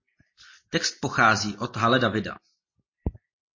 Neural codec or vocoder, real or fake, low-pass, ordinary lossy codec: none; real; 7.2 kHz; MP3, 32 kbps